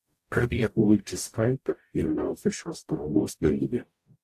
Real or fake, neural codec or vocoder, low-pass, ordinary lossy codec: fake; codec, 44.1 kHz, 0.9 kbps, DAC; 14.4 kHz; AAC, 64 kbps